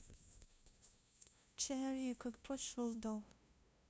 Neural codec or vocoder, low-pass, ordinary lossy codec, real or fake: codec, 16 kHz, 0.5 kbps, FunCodec, trained on LibriTTS, 25 frames a second; none; none; fake